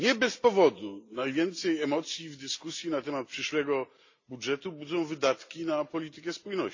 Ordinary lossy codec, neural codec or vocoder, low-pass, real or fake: AAC, 48 kbps; none; 7.2 kHz; real